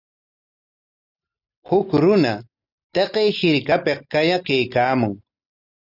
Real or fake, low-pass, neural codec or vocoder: real; 5.4 kHz; none